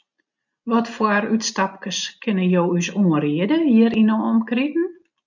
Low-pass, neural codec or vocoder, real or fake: 7.2 kHz; none; real